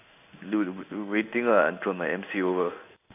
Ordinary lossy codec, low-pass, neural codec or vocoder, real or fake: none; 3.6 kHz; codec, 16 kHz in and 24 kHz out, 1 kbps, XY-Tokenizer; fake